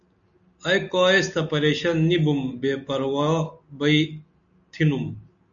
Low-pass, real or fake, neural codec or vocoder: 7.2 kHz; real; none